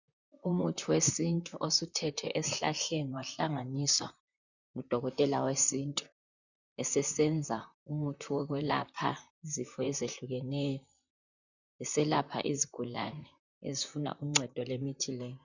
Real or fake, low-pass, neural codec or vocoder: fake; 7.2 kHz; vocoder, 44.1 kHz, 128 mel bands, Pupu-Vocoder